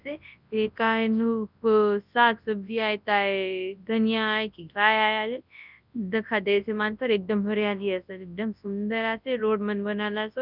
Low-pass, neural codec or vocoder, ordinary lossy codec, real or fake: 5.4 kHz; codec, 24 kHz, 0.9 kbps, WavTokenizer, large speech release; none; fake